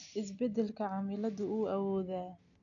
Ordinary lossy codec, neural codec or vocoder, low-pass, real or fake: none; none; 7.2 kHz; real